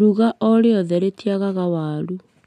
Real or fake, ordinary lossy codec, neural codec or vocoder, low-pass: real; none; none; 14.4 kHz